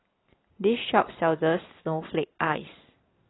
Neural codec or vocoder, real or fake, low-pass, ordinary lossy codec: none; real; 7.2 kHz; AAC, 16 kbps